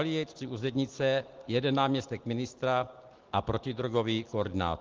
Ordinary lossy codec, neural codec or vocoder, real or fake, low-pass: Opus, 16 kbps; none; real; 7.2 kHz